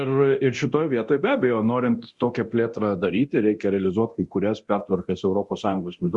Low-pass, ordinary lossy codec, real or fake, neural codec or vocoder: 10.8 kHz; Opus, 64 kbps; fake; codec, 24 kHz, 0.9 kbps, DualCodec